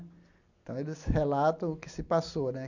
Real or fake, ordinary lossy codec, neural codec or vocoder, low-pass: real; none; none; 7.2 kHz